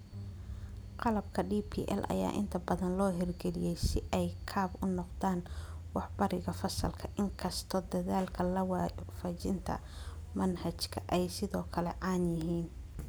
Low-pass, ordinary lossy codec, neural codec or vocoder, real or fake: none; none; none; real